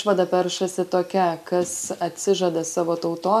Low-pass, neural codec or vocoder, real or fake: 14.4 kHz; none; real